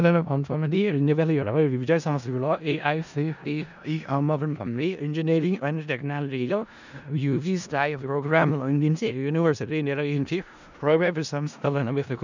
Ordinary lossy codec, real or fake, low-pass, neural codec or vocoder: none; fake; 7.2 kHz; codec, 16 kHz in and 24 kHz out, 0.4 kbps, LongCat-Audio-Codec, four codebook decoder